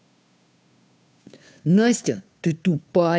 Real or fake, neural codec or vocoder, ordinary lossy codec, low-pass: fake; codec, 16 kHz, 2 kbps, FunCodec, trained on Chinese and English, 25 frames a second; none; none